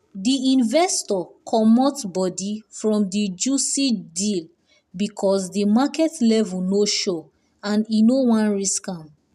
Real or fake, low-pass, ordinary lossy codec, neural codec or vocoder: real; 14.4 kHz; none; none